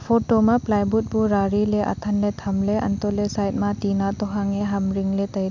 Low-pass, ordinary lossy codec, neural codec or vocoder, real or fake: 7.2 kHz; none; none; real